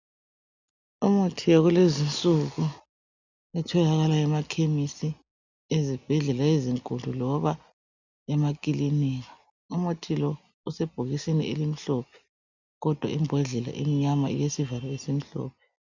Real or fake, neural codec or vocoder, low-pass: real; none; 7.2 kHz